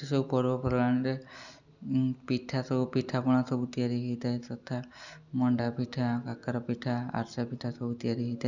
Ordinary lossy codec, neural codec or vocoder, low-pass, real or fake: none; none; 7.2 kHz; real